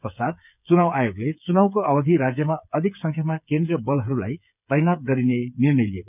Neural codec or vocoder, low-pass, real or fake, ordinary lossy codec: codec, 16 kHz, 8 kbps, FreqCodec, smaller model; 3.6 kHz; fake; none